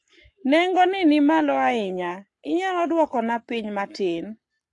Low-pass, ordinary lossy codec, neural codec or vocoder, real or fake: 10.8 kHz; AAC, 48 kbps; codec, 44.1 kHz, 7.8 kbps, Pupu-Codec; fake